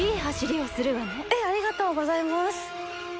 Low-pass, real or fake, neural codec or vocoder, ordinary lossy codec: none; real; none; none